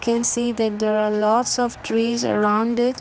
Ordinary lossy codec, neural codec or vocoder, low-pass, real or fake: none; codec, 16 kHz, 2 kbps, X-Codec, HuBERT features, trained on general audio; none; fake